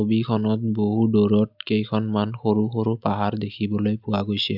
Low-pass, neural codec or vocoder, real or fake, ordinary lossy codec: 5.4 kHz; none; real; none